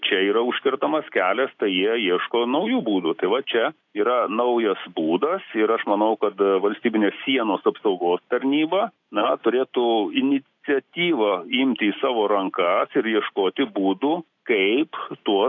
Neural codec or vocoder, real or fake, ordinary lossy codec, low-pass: none; real; AAC, 48 kbps; 7.2 kHz